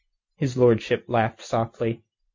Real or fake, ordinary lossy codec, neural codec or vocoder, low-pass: real; MP3, 48 kbps; none; 7.2 kHz